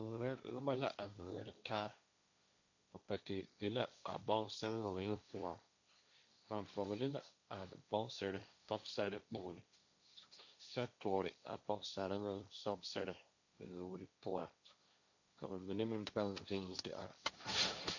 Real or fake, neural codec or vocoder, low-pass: fake; codec, 16 kHz, 1.1 kbps, Voila-Tokenizer; 7.2 kHz